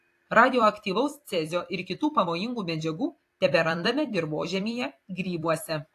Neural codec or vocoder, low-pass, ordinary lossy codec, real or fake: vocoder, 44.1 kHz, 128 mel bands every 256 samples, BigVGAN v2; 14.4 kHz; AAC, 64 kbps; fake